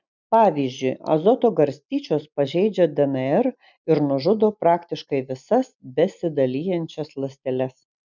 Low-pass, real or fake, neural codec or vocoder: 7.2 kHz; real; none